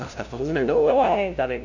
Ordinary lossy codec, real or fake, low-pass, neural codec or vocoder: none; fake; 7.2 kHz; codec, 16 kHz, 0.5 kbps, FunCodec, trained on LibriTTS, 25 frames a second